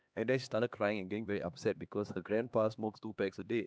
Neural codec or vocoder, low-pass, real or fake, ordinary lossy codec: codec, 16 kHz, 2 kbps, X-Codec, HuBERT features, trained on LibriSpeech; none; fake; none